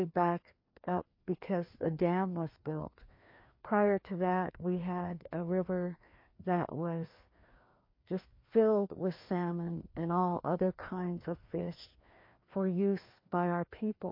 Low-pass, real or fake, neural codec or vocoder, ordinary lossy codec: 5.4 kHz; fake; codec, 16 kHz, 2 kbps, FreqCodec, larger model; MP3, 32 kbps